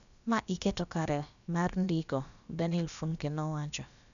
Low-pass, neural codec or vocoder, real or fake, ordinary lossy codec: 7.2 kHz; codec, 16 kHz, about 1 kbps, DyCAST, with the encoder's durations; fake; none